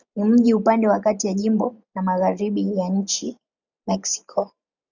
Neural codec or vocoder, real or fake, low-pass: none; real; 7.2 kHz